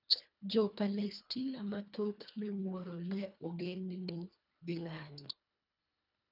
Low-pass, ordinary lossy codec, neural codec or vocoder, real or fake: 5.4 kHz; none; codec, 24 kHz, 1.5 kbps, HILCodec; fake